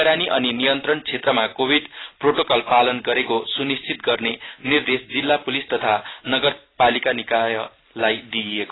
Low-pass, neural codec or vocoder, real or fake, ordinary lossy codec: 7.2 kHz; none; real; AAC, 16 kbps